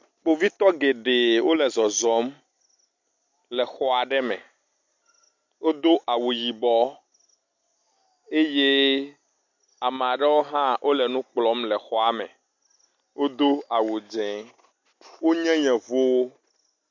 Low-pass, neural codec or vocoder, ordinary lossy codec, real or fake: 7.2 kHz; none; MP3, 48 kbps; real